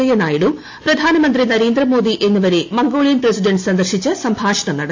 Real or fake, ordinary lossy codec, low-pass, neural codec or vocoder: real; AAC, 48 kbps; 7.2 kHz; none